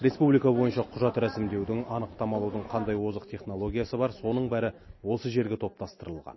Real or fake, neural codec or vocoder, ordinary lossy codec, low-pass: real; none; MP3, 24 kbps; 7.2 kHz